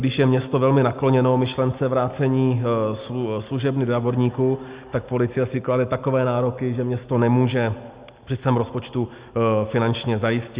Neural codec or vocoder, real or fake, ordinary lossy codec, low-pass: none; real; Opus, 64 kbps; 3.6 kHz